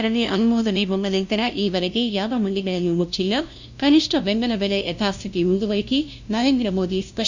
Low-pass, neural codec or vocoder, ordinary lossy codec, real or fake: 7.2 kHz; codec, 16 kHz, 0.5 kbps, FunCodec, trained on LibriTTS, 25 frames a second; Opus, 64 kbps; fake